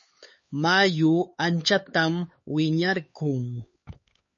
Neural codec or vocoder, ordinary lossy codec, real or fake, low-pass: codec, 16 kHz, 4 kbps, X-Codec, HuBERT features, trained on LibriSpeech; MP3, 32 kbps; fake; 7.2 kHz